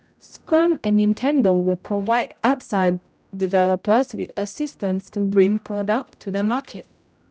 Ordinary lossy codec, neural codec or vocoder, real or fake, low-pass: none; codec, 16 kHz, 0.5 kbps, X-Codec, HuBERT features, trained on general audio; fake; none